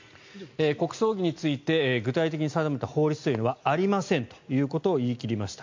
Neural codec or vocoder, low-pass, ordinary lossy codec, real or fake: none; 7.2 kHz; MP3, 48 kbps; real